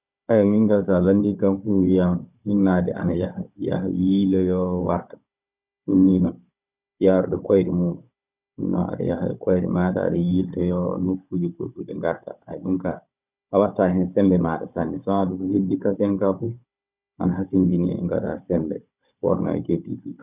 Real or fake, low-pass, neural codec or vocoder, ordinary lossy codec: fake; 3.6 kHz; codec, 16 kHz, 16 kbps, FunCodec, trained on Chinese and English, 50 frames a second; none